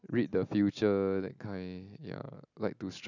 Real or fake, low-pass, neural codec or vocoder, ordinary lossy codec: real; 7.2 kHz; none; none